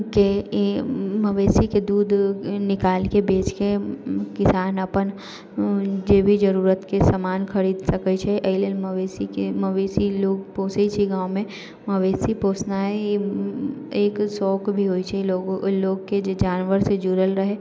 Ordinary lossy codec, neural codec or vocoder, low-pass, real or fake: none; none; none; real